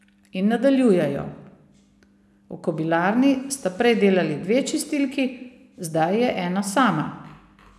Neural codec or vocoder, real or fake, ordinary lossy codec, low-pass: none; real; none; none